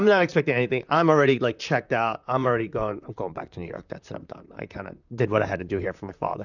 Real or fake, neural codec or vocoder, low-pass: fake; vocoder, 44.1 kHz, 128 mel bands, Pupu-Vocoder; 7.2 kHz